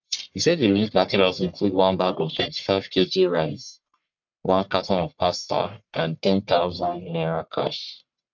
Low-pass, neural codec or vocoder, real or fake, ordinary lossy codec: 7.2 kHz; codec, 44.1 kHz, 1.7 kbps, Pupu-Codec; fake; none